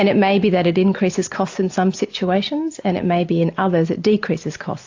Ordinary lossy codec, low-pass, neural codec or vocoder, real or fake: AAC, 48 kbps; 7.2 kHz; none; real